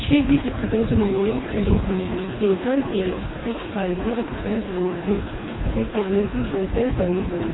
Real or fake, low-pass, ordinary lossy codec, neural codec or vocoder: fake; 7.2 kHz; AAC, 16 kbps; codec, 24 kHz, 1.5 kbps, HILCodec